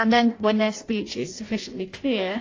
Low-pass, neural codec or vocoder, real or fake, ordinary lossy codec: 7.2 kHz; codec, 16 kHz in and 24 kHz out, 0.6 kbps, FireRedTTS-2 codec; fake; AAC, 32 kbps